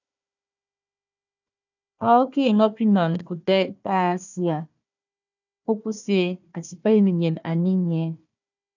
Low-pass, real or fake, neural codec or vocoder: 7.2 kHz; fake; codec, 16 kHz, 1 kbps, FunCodec, trained on Chinese and English, 50 frames a second